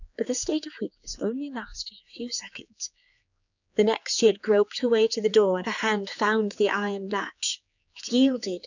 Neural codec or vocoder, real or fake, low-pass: codec, 16 kHz, 4 kbps, X-Codec, HuBERT features, trained on general audio; fake; 7.2 kHz